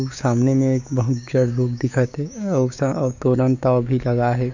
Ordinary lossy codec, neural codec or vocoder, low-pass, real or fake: none; codec, 16 kHz, 6 kbps, DAC; 7.2 kHz; fake